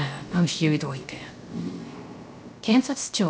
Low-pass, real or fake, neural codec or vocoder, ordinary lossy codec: none; fake; codec, 16 kHz, 0.3 kbps, FocalCodec; none